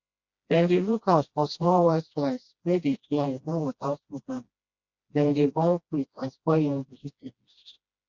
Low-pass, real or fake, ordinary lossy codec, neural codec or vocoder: 7.2 kHz; fake; Opus, 64 kbps; codec, 16 kHz, 1 kbps, FreqCodec, smaller model